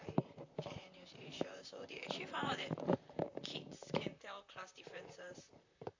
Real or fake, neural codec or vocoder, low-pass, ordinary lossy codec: fake; vocoder, 44.1 kHz, 128 mel bands, Pupu-Vocoder; 7.2 kHz; AAC, 48 kbps